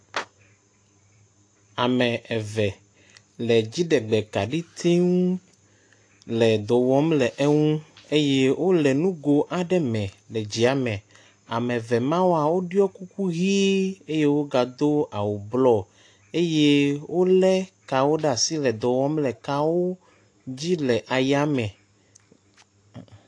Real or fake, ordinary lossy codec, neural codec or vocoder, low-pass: real; AAC, 48 kbps; none; 9.9 kHz